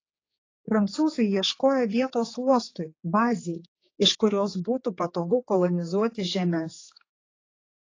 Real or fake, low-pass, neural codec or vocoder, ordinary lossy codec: fake; 7.2 kHz; codec, 16 kHz, 4 kbps, X-Codec, HuBERT features, trained on general audio; AAC, 32 kbps